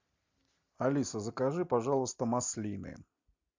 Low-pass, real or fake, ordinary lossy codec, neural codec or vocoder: 7.2 kHz; real; MP3, 64 kbps; none